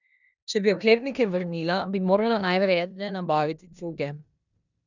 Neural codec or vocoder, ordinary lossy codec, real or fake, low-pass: codec, 16 kHz in and 24 kHz out, 0.9 kbps, LongCat-Audio-Codec, four codebook decoder; none; fake; 7.2 kHz